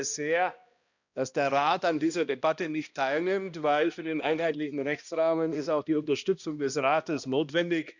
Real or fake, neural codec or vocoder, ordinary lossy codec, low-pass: fake; codec, 16 kHz, 1 kbps, X-Codec, HuBERT features, trained on balanced general audio; none; 7.2 kHz